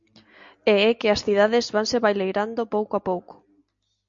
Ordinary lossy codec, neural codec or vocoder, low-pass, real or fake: MP3, 48 kbps; none; 7.2 kHz; real